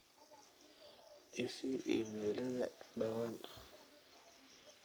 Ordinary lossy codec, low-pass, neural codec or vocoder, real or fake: none; none; codec, 44.1 kHz, 3.4 kbps, Pupu-Codec; fake